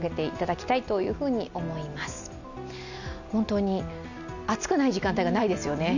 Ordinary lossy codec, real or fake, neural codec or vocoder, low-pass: none; real; none; 7.2 kHz